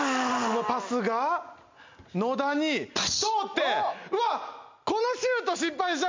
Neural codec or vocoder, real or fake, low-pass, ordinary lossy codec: none; real; 7.2 kHz; MP3, 64 kbps